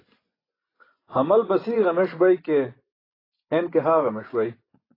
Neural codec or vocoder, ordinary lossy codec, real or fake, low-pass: vocoder, 24 kHz, 100 mel bands, Vocos; AAC, 24 kbps; fake; 5.4 kHz